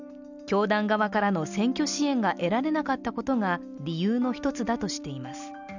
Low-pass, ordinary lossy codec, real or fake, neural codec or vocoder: 7.2 kHz; none; real; none